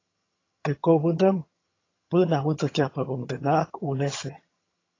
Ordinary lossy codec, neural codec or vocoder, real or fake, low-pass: AAC, 32 kbps; vocoder, 22.05 kHz, 80 mel bands, HiFi-GAN; fake; 7.2 kHz